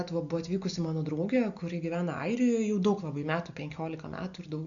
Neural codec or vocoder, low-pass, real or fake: none; 7.2 kHz; real